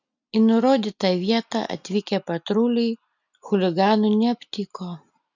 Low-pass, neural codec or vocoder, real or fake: 7.2 kHz; none; real